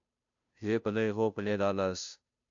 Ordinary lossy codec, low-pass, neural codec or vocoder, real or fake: AAC, 64 kbps; 7.2 kHz; codec, 16 kHz, 0.5 kbps, FunCodec, trained on Chinese and English, 25 frames a second; fake